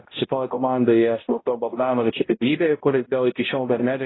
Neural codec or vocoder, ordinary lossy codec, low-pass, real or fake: codec, 16 kHz, 0.5 kbps, X-Codec, HuBERT features, trained on balanced general audio; AAC, 16 kbps; 7.2 kHz; fake